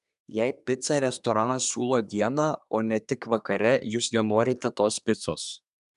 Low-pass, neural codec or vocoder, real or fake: 10.8 kHz; codec, 24 kHz, 1 kbps, SNAC; fake